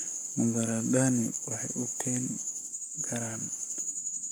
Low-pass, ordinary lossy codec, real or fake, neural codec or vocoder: none; none; fake; codec, 44.1 kHz, 7.8 kbps, Pupu-Codec